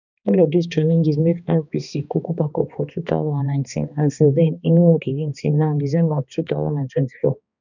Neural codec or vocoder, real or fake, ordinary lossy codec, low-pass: codec, 16 kHz, 2 kbps, X-Codec, HuBERT features, trained on balanced general audio; fake; none; 7.2 kHz